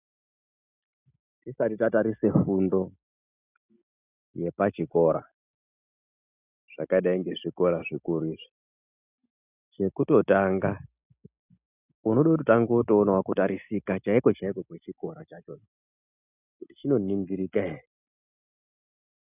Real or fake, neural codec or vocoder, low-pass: real; none; 3.6 kHz